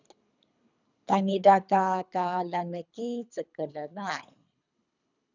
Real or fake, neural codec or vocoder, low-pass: fake; codec, 24 kHz, 3 kbps, HILCodec; 7.2 kHz